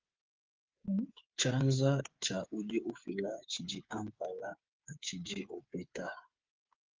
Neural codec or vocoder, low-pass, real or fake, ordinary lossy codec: codec, 16 kHz, 8 kbps, FreqCodec, smaller model; 7.2 kHz; fake; Opus, 32 kbps